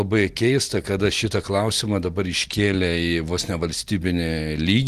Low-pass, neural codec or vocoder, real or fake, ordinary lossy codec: 14.4 kHz; none; real; Opus, 24 kbps